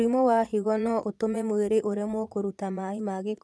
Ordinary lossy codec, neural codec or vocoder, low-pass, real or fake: none; vocoder, 22.05 kHz, 80 mel bands, Vocos; none; fake